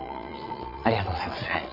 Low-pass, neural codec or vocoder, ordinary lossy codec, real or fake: 5.4 kHz; vocoder, 22.05 kHz, 80 mel bands, Vocos; none; fake